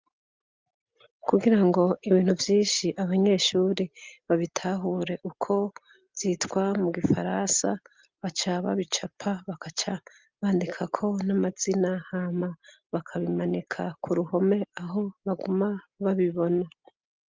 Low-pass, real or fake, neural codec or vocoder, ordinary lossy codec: 7.2 kHz; real; none; Opus, 32 kbps